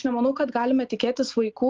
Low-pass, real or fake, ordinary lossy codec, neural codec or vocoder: 7.2 kHz; real; Opus, 32 kbps; none